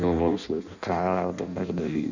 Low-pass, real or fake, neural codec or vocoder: 7.2 kHz; fake; codec, 16 kHz in and 24 kHz out, 0.6 kbps, FireRedTTS-2 codec